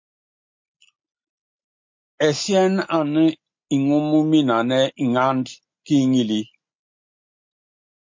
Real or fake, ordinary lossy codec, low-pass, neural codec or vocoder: real; MP3, 48 kbps; 7.2 kHz; none